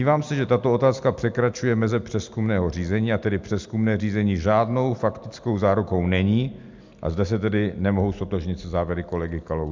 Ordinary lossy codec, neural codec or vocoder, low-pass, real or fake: MP3, 64 kbps; none; 7.2 kHz; real